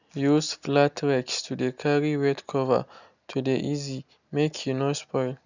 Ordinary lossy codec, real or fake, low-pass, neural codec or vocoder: none; real; 7.2 kHz; none